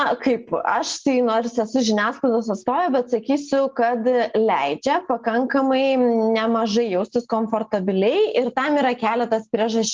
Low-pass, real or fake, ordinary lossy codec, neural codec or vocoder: 7.2 kHz; real; Opus, 24 kbps; none